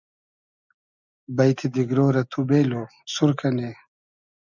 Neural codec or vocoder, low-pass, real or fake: none; 7.2 kHz; real